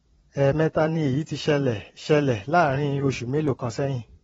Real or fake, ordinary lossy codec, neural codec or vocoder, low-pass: fake; AAC, 24 kbps; vocoder, 22.05 kHz, 80 mel bands, Vocos; 9.9 kHz